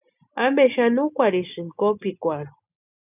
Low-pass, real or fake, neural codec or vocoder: 3.6 kHz; real; none